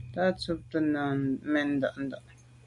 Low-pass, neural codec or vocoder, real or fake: 10.8 kHz; none; real